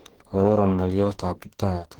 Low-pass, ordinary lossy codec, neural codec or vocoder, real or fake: 19.8 kHz; Opus, 16 kbps; codec, 44.1 kHz, 2.6 kbps, DAC; fake